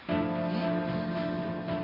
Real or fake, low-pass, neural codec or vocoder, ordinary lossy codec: real; 5.4 kHz; none; none